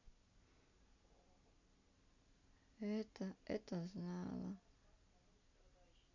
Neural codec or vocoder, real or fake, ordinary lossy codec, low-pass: none; real; none; 7.2 kHz